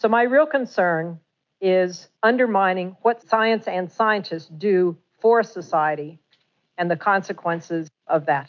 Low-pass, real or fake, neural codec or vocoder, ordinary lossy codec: 7.2 kHz; real; none; MP3, 64 kbps